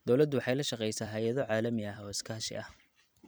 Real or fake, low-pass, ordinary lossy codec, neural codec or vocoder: fake; none; none; vocoder, 44.1 kHz, 128 mel bands every 256 samples, BigVGAN v2